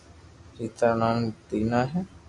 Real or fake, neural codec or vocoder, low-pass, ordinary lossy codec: real; none; 10.8 kHz; MP3, 96 kbps